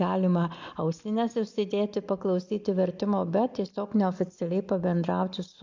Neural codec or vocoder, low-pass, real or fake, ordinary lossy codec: none; 7.2 kHz; real; MP3, 64 kbps